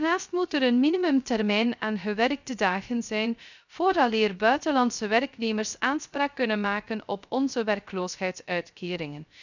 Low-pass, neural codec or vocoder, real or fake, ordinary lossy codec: 7.2 kHz; codec, 16 kHz, 0.3 kbps, FocalCodec; fake; none